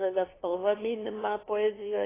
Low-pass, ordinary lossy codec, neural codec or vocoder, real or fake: 3.6 kHz; AAC, 16 kbps; codec, 16 kHz, 2 kbps, FunCodec, trained on LibriTTS, 25 frames a second; fake